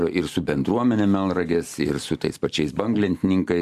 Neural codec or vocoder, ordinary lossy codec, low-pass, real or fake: none; MP3, 96 kbps; 14.4 kHz; real